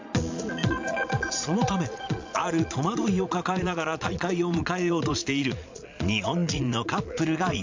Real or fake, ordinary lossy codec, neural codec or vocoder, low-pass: fake; MP3, 64 kbps; vocoder, 22.05 kHz, 80 mel bands, Vocos; 7.2 kHz